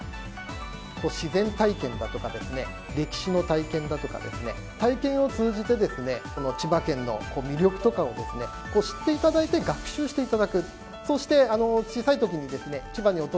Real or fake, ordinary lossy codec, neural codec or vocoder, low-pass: real; none; none; none